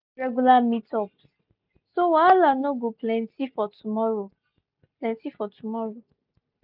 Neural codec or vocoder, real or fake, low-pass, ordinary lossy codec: none; real; 5.4 kHz; none